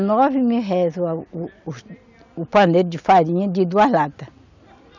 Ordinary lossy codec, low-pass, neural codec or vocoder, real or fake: none; 7.2 kHz; none; real